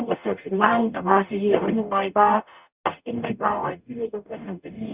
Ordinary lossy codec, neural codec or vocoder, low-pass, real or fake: Opus, 64 kbps; codec, 44.1 kHz, 0.9 kbps, DAC; 3.6 kHz; fake